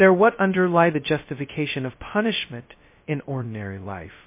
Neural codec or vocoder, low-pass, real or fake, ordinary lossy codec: codec, 16 kHz, 0.2 kbps, FocalCodec; 3.6 kHz; fake; MP3, 24 kbps